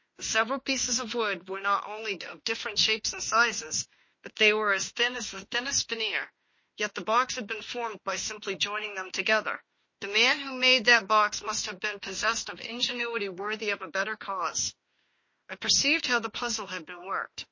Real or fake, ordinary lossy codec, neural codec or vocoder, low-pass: fake; MP3, 32 kbps; autoencoder, 48 kHz, 32 numbers a frame, DAC-VAE, trained on Japanese speech; 7.2 kHz